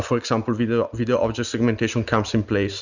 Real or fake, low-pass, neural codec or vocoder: real; 7.2 kHz; none